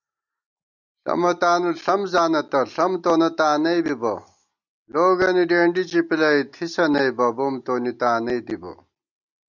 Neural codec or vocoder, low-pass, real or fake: none; 7.2 kHz; real